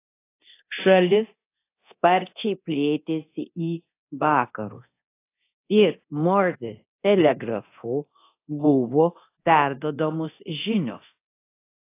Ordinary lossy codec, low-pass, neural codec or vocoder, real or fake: AAC, 24 kbps; 3.6 kHz; codec, 24 kHz, 0.9 kbps, DualCodec; fake